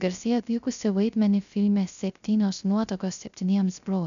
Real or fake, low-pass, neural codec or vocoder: fake; 7.2 kHz; codec, 16 kHz, 0.3 kbps, FocalCodec